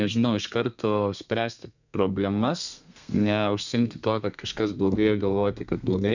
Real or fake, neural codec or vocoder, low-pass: fake; codec, 32 kHz, 1.9 kbps, SNAC; 7.2 kHz